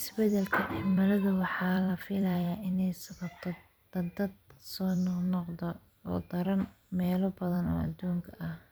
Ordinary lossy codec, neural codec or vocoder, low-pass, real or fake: none; vocoder, 44.1 kHz, 128 mel bands every 256 samples, BigVGAN v2; none; fake